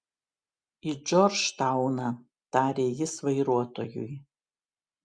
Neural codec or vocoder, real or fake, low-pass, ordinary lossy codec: none; real; 9.9 kHz; Opus, 64 kbps